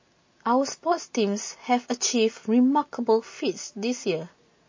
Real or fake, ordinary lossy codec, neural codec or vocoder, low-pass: real; MP3, 32 kbps; none; 7.2 kHz